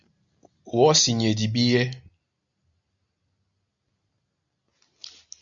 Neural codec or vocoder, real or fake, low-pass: none; real; 7.2 kHz